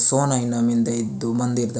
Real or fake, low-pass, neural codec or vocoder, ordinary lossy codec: real; none; none; none